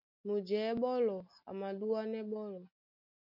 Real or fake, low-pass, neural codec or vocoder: real; 5.4 kHz; none